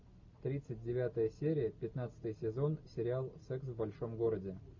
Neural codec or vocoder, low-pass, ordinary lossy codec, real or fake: none; 7.2 kHz; MP3, 48 kbps; real